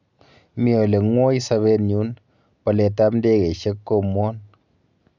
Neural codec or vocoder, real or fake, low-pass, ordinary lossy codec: none; real; 7.2 kHz; none